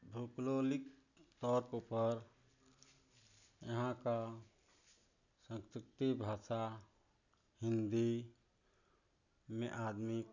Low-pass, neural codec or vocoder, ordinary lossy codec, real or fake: 7.2 kHz; none; none; real